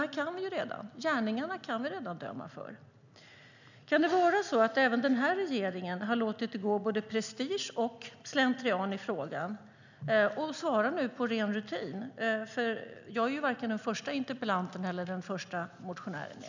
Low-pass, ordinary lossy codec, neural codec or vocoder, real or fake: 7.2 kHz; none; none; real